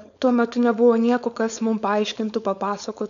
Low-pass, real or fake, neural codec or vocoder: 7.2 kHz; fake; codec, 16 kHz, 4.8 kbps, FACodec